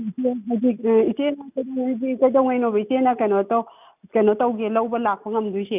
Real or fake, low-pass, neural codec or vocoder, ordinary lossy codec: real; 3.6 kHz; none; none